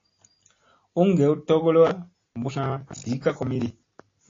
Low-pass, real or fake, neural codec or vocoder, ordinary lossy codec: 7.2 kHz; real; none; AAC, 32 kbps